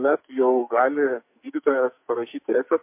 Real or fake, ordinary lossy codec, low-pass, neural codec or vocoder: fake; MP3, 32 kbps; 3.6 kHz; codec, 44.1 kHz, 2.6 kbps, SNAC